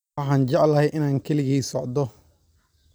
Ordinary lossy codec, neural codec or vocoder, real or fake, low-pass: none; vocoder, 44.1 kHz, 128 mel bands every 256 samples, BigVGAN v2; fake; none